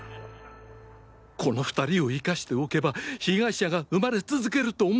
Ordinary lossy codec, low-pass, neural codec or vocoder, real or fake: none; none; none; real